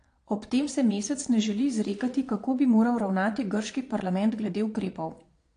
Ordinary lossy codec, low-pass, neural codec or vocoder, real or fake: AAC, 48 kbps; 9.9 kHz; vocoder, 22.05 kHz, 80 mel bands, Vocos; fake